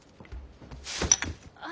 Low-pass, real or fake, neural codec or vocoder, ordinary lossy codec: none; real; none; none